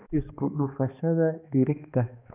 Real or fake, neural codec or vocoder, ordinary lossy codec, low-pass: fake; codec, 16 kHz, 2 kbps, X-Codec, HuBERT features, trained on balanced general audio; none; 3.6 kHz